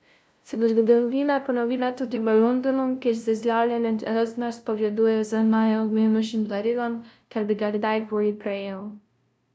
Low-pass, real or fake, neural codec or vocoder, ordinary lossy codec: none; fake; codec, 16 kHz, 0.5 kbps, FunCodec, trained on LibriTTS, 25 frames a second; none